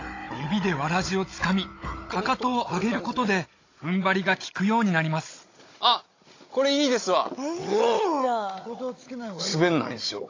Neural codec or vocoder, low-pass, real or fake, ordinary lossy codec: codec, 16 kHz, 16 kbps, FunCodec, trained on Chinese and English, 50 frames a second; 7.2 kHz; fake; AAC, 32 kbps